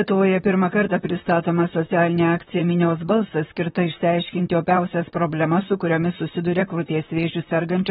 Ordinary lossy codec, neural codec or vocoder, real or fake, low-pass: AAC, 16 kbps; vocoder, 44.1 kHz, 128 mel bands, Pupu-Vocoder; fake; 19.8 kHz